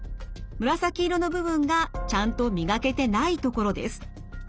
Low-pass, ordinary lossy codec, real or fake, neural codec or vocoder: none; none; real; none